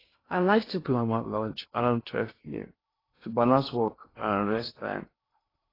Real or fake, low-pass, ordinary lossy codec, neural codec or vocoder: fake; 5.4 kHz; AAC, 24 kbps; codec, 16 kHz in and 24 kHz out, 0.6 kbps, FocalCodec, streaming, 2048 codes